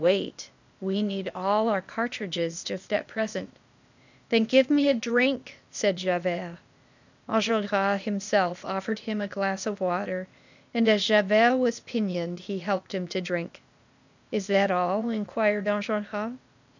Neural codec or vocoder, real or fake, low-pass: codec, 16 kHz, 0.8 kbps, ZipCodec; fake; 7.2 kHz